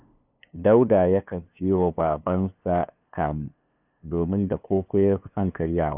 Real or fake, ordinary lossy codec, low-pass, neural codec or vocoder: fake; none; 3.6 kHz; codec, 16 kHz, 2 kbps, FunCodec, trained on LibriTTS, 25 frames a second